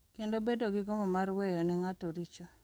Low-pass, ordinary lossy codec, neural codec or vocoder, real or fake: none; none; codec, 44.1 kHz, 7.8 kbps, DAC; fake